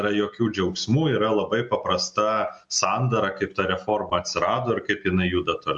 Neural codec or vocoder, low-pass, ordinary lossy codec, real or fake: none; 10.8 kHz; MP3, 64 kbps; real